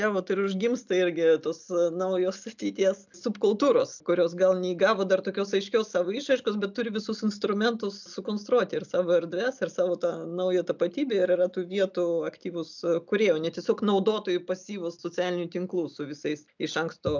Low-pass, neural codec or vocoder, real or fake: 7.2 kHz; none; real